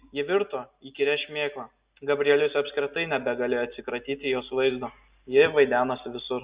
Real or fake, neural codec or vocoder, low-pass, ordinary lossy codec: real; none; 3.6 kHz; Opus, 64 kbps